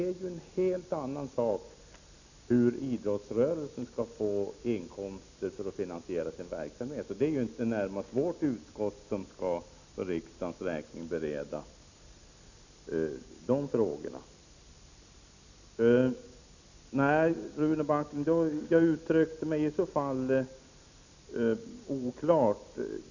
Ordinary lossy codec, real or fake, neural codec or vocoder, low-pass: none; real; none; 7.2 kHz